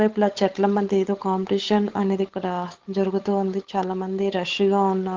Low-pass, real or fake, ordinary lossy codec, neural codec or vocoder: 7.2 kHz; fake; Opus, 16 kbps; codec, 16 kHz, 8 kbps, FunCodec, trained on Chinese and English, 25 frames a second